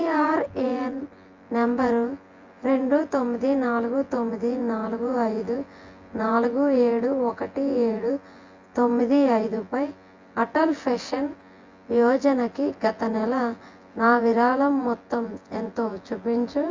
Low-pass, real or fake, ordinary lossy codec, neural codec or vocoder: 7.2 kHz; fake; Opus, 32 kbps; vocoder, 24 kHz, 100 mel bands, Vocos